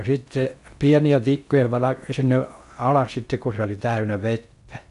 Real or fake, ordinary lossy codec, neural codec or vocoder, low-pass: fake; none; codec, 16 kHz in and 24 kHz out, 0.6 kbps, FocalCodec, streaming, 2048 codes; 10.8 kHz